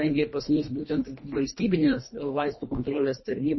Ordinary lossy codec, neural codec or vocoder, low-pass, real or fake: MP3, 24 kbps; codec, 24 kHz, 1.5 kbps, HILCodec; 7.2 kHz; fake